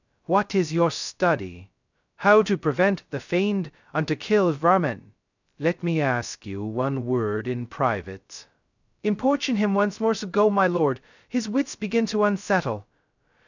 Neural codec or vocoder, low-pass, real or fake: codec, 16 kHz, 0.2 kbps, FocalCodec; 7.2 kHz; fake